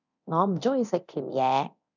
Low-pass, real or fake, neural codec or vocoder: 7.2 kHz; fake; codec, 24 kHz, 0.9 kbps, DualCodec